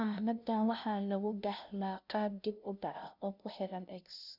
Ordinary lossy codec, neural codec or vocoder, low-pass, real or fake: none; codec, 16 kHz, 0.5 kbps, FunCodec, trained on LibriTTS, 25 frames a second; 5.4 kHz; fake